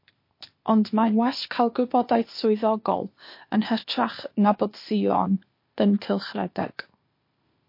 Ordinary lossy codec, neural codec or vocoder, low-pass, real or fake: MP3, 32 kbps; codec, 16 kHz, 0.8 kbps, ZipCodec; 5.4 kHz; fake